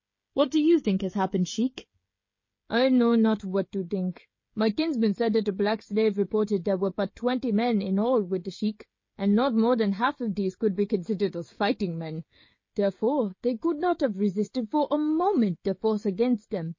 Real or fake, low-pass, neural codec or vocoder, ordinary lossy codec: fake; 7.2 kHz; codec, 16 kHz, 16 kbps, FreqCodec, smaller model; MP3, 32 kbps